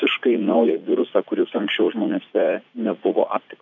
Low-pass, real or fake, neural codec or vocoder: 7.2 kHz; fake; vocoder, 44.1 kHz, 80 mel bands, Vocos